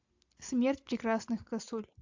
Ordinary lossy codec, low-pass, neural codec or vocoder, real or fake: AAC, 48 kbps; 7.2 kHz; none; real